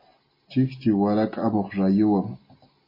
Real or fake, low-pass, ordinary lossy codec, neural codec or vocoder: real; 5.4 kHz; MP3, 24 kbps; none